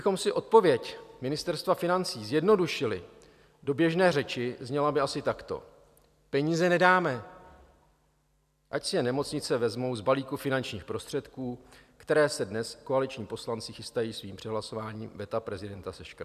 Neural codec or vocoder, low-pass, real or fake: none; 14.4 kHz; real